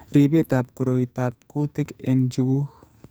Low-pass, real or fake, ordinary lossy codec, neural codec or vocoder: none; fake; none; codec, 44.1 kHz, 2.6 kbps, SNAC